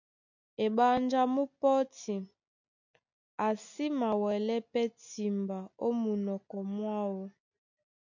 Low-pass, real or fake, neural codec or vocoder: 7.2 kHz; real; none